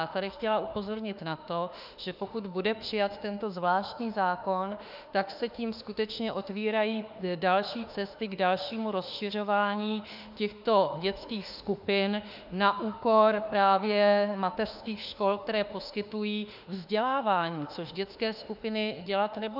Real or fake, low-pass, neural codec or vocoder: fake; 5.4 kHz; autoencoder, 48 kHz, 32 numbers a frame, DAC-VAE, trained on Japanese speech